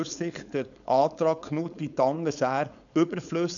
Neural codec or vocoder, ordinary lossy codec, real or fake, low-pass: codec, 16 kHz, 4.8 kbps, FACodec; none; fake; 7.2 kHz